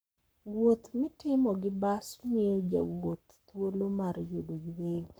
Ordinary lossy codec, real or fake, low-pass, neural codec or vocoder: none; fake; none; codec, 44.1 kHz, 7.8 kbps, Pupu-Codec